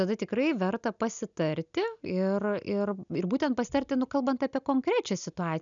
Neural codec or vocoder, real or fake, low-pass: none; real; 7.2 kHz